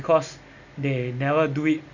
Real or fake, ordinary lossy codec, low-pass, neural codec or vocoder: real; none; 7.2 kHz; none